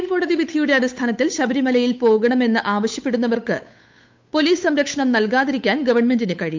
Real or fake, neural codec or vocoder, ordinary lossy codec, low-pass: fake; codec, 16 kHz, 8 kbps, FunCodec, trained on Chinese and English, 25 frames a second; MP3, 64 kbps; 7.2 kHz